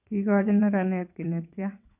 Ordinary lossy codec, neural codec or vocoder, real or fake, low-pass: none; vocoder, 22.05 kHz, 80 mel bands, WaveNeXt; fake; 3.6 kHz